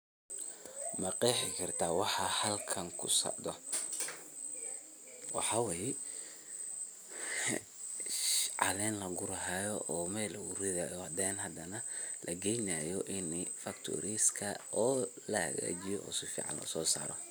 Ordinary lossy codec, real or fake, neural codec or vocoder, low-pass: none; real; none; none